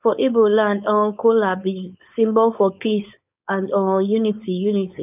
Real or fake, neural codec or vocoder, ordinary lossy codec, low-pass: fake; codec, 16 kHz, 4.8 kbps, FACodec; none; 3.6 kHz